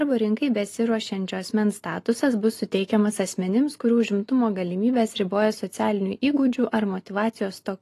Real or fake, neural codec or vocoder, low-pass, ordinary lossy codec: real; none; 14.4 kHz; AAC, 48 kbps